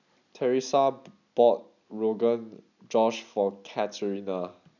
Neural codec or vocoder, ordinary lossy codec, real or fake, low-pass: none; none; real; 7.2 kHz